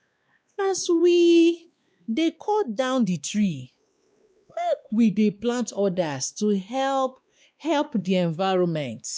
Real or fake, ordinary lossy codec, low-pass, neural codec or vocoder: fake; none; none; codec, 16 kHz, 2 kbps, X-Codec, WavLM features, trained on Multilingual LibriSpeech